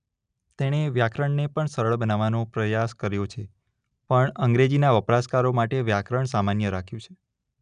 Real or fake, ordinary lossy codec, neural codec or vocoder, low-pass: real; none; none; 9.9 kHz